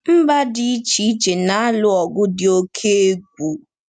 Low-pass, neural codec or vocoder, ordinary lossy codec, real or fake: 9.9 kHz; none; none; real